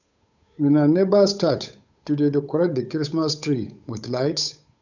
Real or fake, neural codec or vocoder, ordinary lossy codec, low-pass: fake; codec, 16 kHz, 8 kbps, FunCodec, trained on Chinese and English, 25 frames a second; none; 7.2 kHz